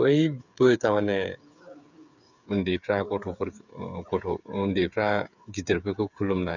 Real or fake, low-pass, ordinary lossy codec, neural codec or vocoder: fake; 7.2 kHz; none; codec, 16 kHz, 8 kbps, FreqCodec, smaller model